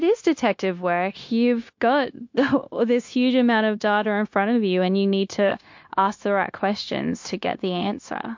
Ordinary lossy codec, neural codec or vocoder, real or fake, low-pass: MP3, 48 kbps; codec, 16 kHz, 0.9 kbps, LongCat-Audio-Codec; fake; 7.2 kHz